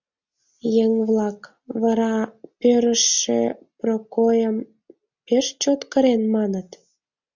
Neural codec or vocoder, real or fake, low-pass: none; real; 7.2 kHz